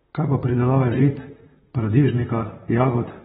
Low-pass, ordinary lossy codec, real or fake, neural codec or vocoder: 19.8 kHz; AAC, 16 kbps; fake; vocoder, 44.1 kHz, 128 mel bands, Pupu-Vocoder